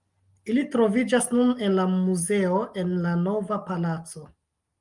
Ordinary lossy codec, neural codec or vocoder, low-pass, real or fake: Opus, 32 kbps; none; 10.8 kHz; real